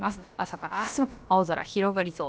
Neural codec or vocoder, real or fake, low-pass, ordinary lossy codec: codec, 16 kHz, about 1 kbps, DyCAST, with the encoder's durations; fake; none; none